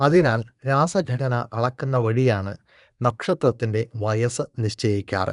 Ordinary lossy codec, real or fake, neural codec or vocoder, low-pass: none; fake; codec, 24 kHz, 1 kbps, SNAC; 10.8 kHz